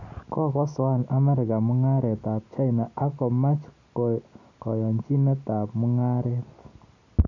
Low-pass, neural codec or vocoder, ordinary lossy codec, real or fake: 7.2 kHz; none; none; real